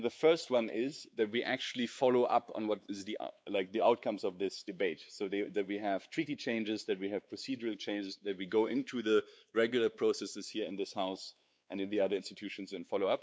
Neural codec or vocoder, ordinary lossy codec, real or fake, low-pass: codec, 16 kHz, 4 kbps, X-Codec, WavLM features, trained on Multilingual LibriSpeech; none; fake; none